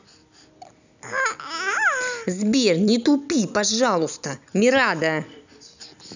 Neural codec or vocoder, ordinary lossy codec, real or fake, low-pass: none; none; real; 7.2 kHz